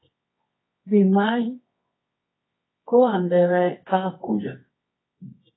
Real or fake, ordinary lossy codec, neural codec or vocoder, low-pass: fake; AAC, 16 kbps; codec, 24 kHz, 0.9 kbps, WavTokenizer, medium music audio release; 7.2 kHz